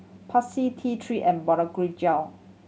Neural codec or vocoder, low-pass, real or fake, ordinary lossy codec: none; none; real; none